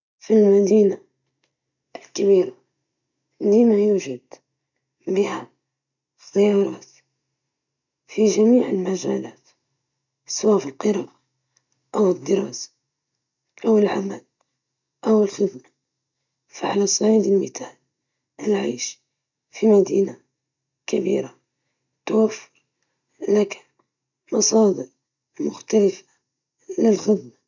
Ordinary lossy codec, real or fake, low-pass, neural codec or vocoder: none; real; 7.2 kHz; none